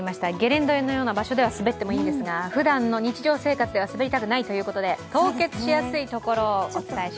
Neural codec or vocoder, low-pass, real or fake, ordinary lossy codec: none; none; real; none